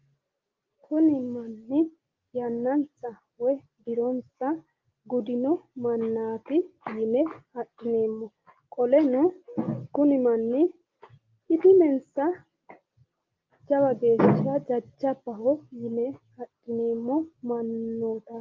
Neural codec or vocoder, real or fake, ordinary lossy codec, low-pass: none; real; Opus, 32 kbps; 7.2 kHz